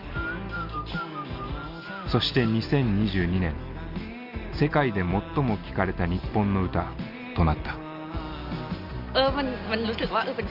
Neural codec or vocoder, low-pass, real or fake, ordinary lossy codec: none; 5.4 kHz; real; Opus, 32 kbps